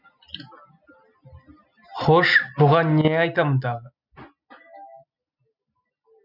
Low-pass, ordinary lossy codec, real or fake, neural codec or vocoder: 5.4 kHz; none; real; none